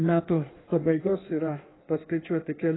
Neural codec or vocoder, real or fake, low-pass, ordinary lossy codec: codec, 16 kHz in and 24 kHz out, 1.1 kbps, FireRedTTS-2 codec; fake; 7.2 kHz; AAC, 16 kbps